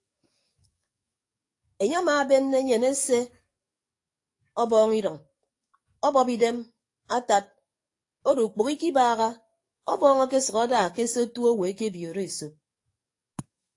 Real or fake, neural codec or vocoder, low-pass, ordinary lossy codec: fake; codec, 44.1 kHz, 7.8 kbps, DAC; 10.8 kHz; AAC, 48 kbps